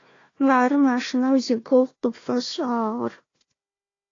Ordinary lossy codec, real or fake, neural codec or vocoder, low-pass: AAC, 32 kbps; fake; codec, 16 kHz, 1 kbps, FunCodec, trained on Chinese and English, 50 frames a second; 7.2 kHz